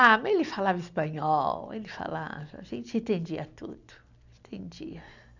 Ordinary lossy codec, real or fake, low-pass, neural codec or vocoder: none; real; 7.2 kHz; none